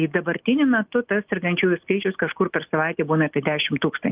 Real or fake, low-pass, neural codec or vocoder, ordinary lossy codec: real; 3.6 kHz; none; Opus, 32 kbps